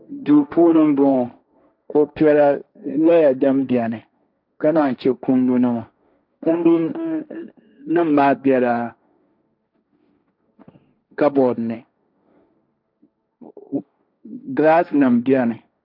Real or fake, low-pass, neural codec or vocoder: fake; 5.4 kHz; codec, 16 kHz, 1.1 kbps, Voila-Tokenizer